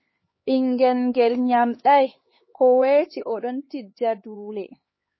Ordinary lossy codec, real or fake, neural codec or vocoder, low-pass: MP3, 24 kbps; fake; codec, 16 kHz, 4 kbps, X-Codec, HuBERT features, trained on LibriSpeech; 7.2 kHz